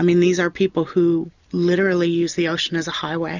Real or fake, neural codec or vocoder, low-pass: real; none; 7.2 kHz